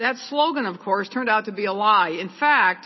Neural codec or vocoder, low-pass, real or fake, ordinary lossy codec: none; 7.2 kHz; real; MP3, 24 kbps